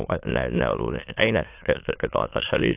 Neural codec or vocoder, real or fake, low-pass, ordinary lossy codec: autoencoder, 22.05 kHz, a latent of 192 numbers a frame, VITS, trained on many speakers; fake; 3.6 kHz; AAC, 32 kbps